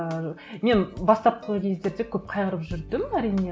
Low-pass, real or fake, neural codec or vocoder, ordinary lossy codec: none; real; none; none